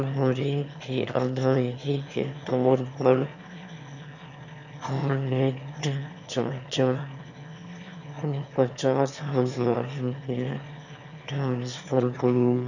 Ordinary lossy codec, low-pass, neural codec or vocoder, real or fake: none; 7.2 kHz; autoencoder, 22.05 kHz, a latent of 192 numbers a frame, VITS, trained on one speaker; fake